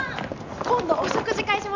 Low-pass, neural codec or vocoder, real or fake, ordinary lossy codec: 7.2 kHz; none; real; Opus, 64 kbps